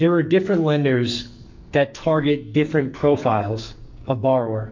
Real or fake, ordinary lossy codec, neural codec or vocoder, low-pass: fake; MP3, 48 kbps; codec, 44.1 kHz, 2.6 kbps, SNAC; 7.2 kHz